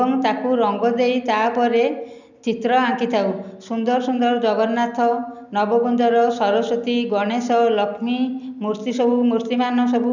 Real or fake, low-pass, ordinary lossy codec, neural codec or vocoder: real; 7.2 kHz; none; none